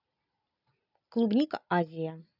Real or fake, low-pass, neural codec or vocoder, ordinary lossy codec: real; 5.4 kHz; none; none